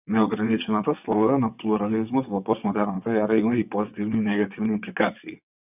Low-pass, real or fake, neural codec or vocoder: 3.6 kHz; fake; vocoder, 22.05 kHz, 80 mel bands, WaveNeXt